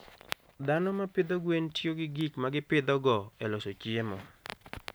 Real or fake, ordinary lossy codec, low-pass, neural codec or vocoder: real; none; none; none